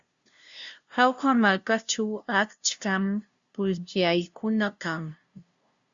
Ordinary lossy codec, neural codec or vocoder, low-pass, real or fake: Opus, 64 kbps; codec, 16 kHz, 0.5 kbps, FunCodec, trained on LibriTTS, 25 frames a second; 7.2 kHz; fake